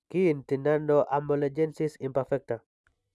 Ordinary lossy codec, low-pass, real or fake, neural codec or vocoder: none; none; real; none